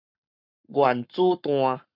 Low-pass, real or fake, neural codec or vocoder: 5.4 kHz; fake; codec, 44.1 kHz, 7.8 kbps, Pupu-Codec